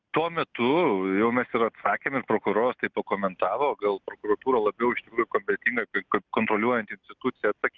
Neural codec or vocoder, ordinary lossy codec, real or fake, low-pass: none; Opus, 24 kbps; real; 7.2 kHz